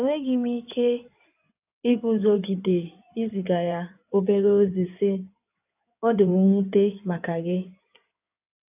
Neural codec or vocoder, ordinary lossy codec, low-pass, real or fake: codec, 16 kHz in and 24 kHz out, 2.2 kbps, FireRedTTS-2 codec; AAC, 32 kbps; 3.6 kHz; fake